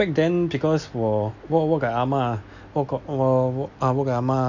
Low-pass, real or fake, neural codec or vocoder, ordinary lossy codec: 7.2 kHz; real; none; none